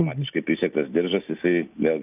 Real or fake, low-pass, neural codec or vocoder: real; 3.6 kHz; none